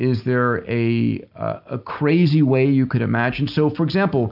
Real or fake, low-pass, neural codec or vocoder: real; 5.4 kHz; none